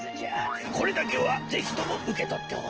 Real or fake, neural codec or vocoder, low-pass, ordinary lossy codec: real; none; 7.2 kHz; Opus, 16 kbps